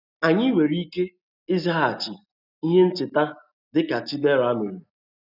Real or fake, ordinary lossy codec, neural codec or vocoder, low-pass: real; none; none; 5.4 kHz